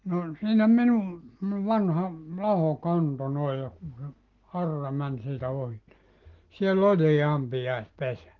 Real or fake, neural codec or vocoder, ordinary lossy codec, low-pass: real; none; Opus, 16 kbps; 7.2 kHz